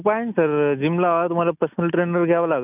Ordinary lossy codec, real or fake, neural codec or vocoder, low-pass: none; real; none; 3.6 kHz